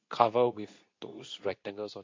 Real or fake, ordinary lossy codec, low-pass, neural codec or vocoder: fake; MP3, 48 kbps; 7.2 kHz; codec, 24 kHz, 0.9 kbps, WavTokenizer, medium speech release version 2